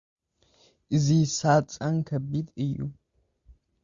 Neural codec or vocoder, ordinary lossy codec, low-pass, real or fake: none; Opus, 64 kbps; 7.2 kHz; real